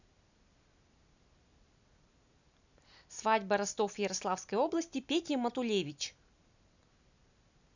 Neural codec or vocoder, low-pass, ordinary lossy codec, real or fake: none; 7.2 kHz; none; real